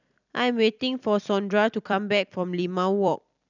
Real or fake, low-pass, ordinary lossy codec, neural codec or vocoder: fake; 7.2 kHz; none; vocoder, 44.1 kHz, 128 mel bands every 256 samples, BigVGAN v2